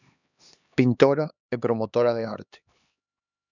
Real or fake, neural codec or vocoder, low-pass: fake; codec, 16 kHz, 2 kbps, X-Codec, HuBERT features, trained on LibriSpeech; 7.2 kHz